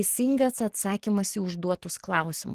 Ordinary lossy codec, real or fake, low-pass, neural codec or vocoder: Opus, 16 kbps; fake; 14.4 kHz; codec, 44.1 kHz, 7.8 kbps, DAC